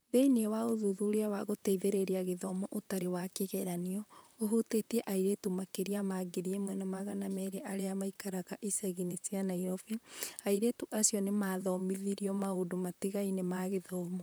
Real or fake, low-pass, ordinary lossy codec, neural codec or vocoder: fake; none; none; vocoder, 44.1 kHz, 128 mel bands, Pupu-Vocoder